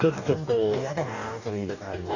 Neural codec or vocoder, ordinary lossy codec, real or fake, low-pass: codec, 44.1 kHz, 2.6 kbps, DAC; none; fake; 7.2 kHz